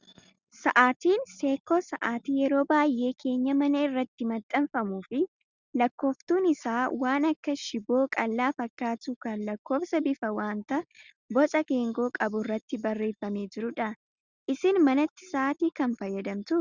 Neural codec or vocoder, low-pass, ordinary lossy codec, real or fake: none; 7.2 kHz; Opus, 64 kbps; real